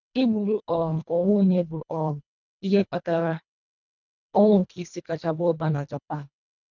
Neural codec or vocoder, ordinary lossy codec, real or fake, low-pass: codec, 24 kHz, 1.5 kbps, HILCodec; none; fake; 7.2 kHz